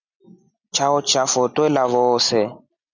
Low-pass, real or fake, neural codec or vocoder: 7.2 kHz; real; none